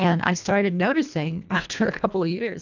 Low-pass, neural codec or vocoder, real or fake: 7.2 kHz; codec, 24 kHz, 1.5 kbps, HILCodec; fake